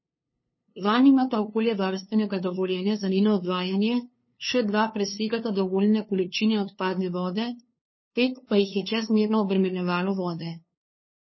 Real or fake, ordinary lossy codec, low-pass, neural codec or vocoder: fake; MP3, 24 kbps; 7.2 kHz; codec, 16 kHz, 2 kbps, FunCodec, trained on LibriTTS, 25 frames a second